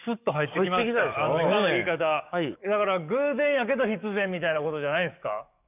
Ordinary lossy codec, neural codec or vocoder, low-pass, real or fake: none; codec, 44.1 kHz, 7.8 kbps, DAC; 3.6 kHz; fake